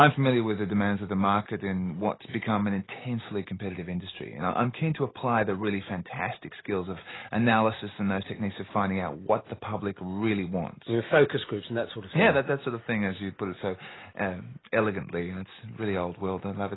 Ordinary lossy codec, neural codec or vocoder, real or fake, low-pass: AAC, 16 kbps; none; real; 7.2 kHz